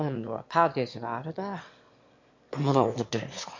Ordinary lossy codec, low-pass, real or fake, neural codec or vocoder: MP3, 48 kbps; 7.2 kHz; fake; autoencoder, 22.05 kHz, a latent of 192 numbers a frame, VITS, trained on one speaker